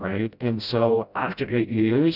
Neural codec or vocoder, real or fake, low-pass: codec, 16 kHz, 0.5 kbps, FreqCodec, smaller model; fake; 5.4 kHz